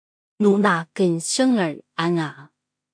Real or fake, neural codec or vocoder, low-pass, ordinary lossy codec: fake; codec, 16 kHz in and 24 kHz out, 0.4 kbps, LongCat-Audio-Codec, two codebook decoder; 9.9 kHz; MP3, 64 kbps